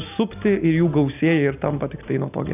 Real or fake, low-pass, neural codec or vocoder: real; 3.6 kHz; none